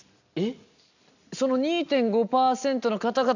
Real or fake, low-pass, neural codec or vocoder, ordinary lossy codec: real; 7.2 kHz; none; none